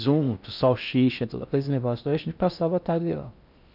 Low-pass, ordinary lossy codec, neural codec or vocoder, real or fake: 5.4 kHz; none; codec, 16 kHz in and 24 kHz out, 0.6 kbps, FocalCodec, streaming, 4096 codes; fake